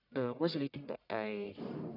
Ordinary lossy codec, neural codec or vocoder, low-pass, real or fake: none; codec, 44.1 kHz, 1.7 kbps, Pupu-Codec; 5.4 kHz; fake